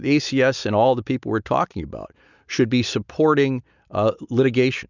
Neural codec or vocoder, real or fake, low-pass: none; real; 7.2 kHz